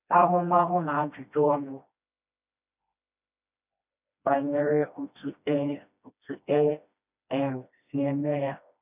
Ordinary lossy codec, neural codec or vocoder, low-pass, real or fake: none; codec, 16 kHz, 1 kbps, FreqCodec, smaller model; 3.6 kHz; fake